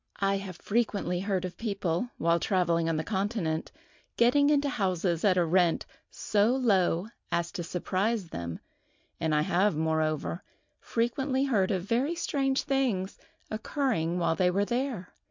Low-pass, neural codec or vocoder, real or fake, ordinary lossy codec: 7.2 kHz; none; real; MP3, 64 kbps